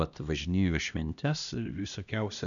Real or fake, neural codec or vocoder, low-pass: fake; codec, 16 kHz, 1 kbps, X-Codec, HuBERT features, trained on LibriSpeech; 7.2 kHz